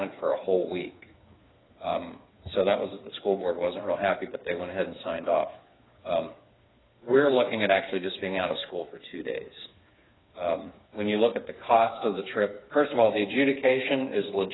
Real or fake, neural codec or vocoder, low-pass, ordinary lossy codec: fake; codec, 16 kHz, 4 kbps, FreqCodec, smaller model; 7.2 kHz; AAC, 16 kbps